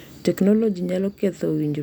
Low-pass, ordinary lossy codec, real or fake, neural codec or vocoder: 19.8 kHz; none; real; none